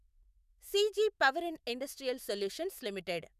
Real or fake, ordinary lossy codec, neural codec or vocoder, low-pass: fake; none; autoencoder, 48 kHz, 128 numbers a frame, DAC-VAE, trained on Japanese speech; 14.4 kHz